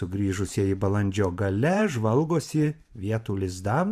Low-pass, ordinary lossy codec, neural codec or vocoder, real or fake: 14.4 kHz; AAC, 96 kbps; vocoder, 44.1 kHz, 128 mel bands every 512 samples, BigVGAN v2; fake